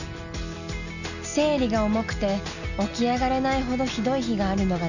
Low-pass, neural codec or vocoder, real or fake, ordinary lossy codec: 7.2 kHz; none; real; none